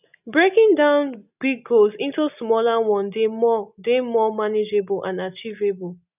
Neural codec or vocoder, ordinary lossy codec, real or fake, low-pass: none; none; real; 3.6 kHz